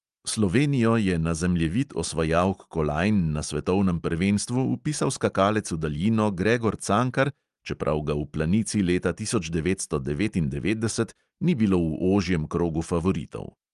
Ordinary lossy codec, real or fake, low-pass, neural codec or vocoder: Opus, 32 kbps; real; 10.8 kHz; none